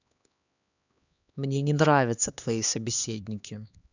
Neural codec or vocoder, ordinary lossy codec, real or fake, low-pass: codec, 16 kHz, 2 kbps, X-Codec, HuBERT features, trained on LibriSpeech; none; fake; 7.2 kHz